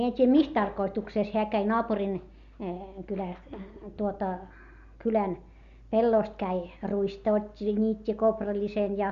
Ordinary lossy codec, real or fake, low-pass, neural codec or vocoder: Opus, 64 kbps; real; 7.2 kHz; none